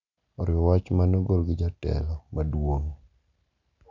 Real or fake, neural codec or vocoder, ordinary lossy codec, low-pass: real; none; none; 7.2 kHz